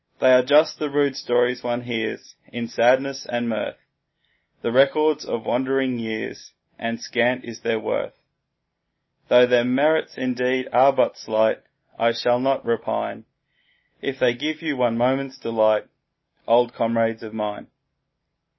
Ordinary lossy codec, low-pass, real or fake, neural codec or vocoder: MP3, 24 kbps; 7.2 kHz; real; none